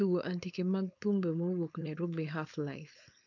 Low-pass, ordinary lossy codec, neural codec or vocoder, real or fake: 7.2 kHz; none; codec, 16 kHz, 4.8 kbps, FACodec; fake